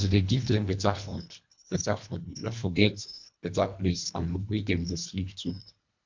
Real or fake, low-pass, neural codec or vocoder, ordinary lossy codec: fake; 7.2 kHz; codec, 24 kHz, 1.5 kbps, HILCodec; MP3, 64 kbps